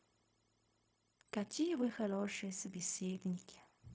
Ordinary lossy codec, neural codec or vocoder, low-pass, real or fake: none; codec, 16 kHz, 0.4 kbps, LongCat-Audio-Codec; none; fake